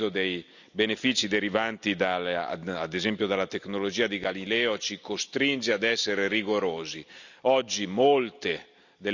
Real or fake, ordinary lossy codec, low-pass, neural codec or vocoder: real; none; 7.2 kHz; none